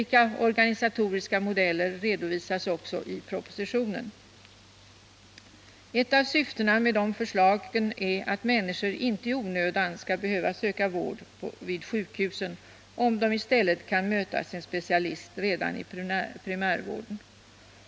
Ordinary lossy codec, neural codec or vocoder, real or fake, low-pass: none; none; real; none